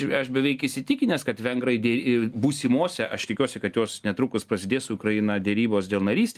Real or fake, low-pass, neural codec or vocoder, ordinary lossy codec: real; 14.4 kHz; none; Opus, 32 kbps